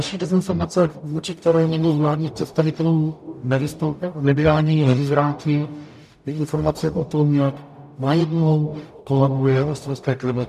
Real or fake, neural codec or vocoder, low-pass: fake; codec, 44.1 kHz, 0.9 kbps, DAC; 14.4 kHz